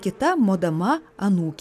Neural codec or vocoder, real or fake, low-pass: none; real; 14.4 kHz